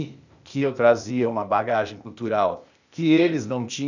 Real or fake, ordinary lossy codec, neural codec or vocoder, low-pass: fake; none; codec, 16 kHz, 0.8 kbps, ZipCodec; 7.2 kHz